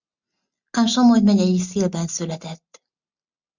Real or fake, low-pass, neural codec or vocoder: real; 7.2 kHz; none